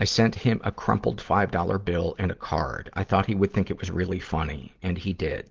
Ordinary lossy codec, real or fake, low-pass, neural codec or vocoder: Opus, 16 kbps; real; 7.2 kHz; none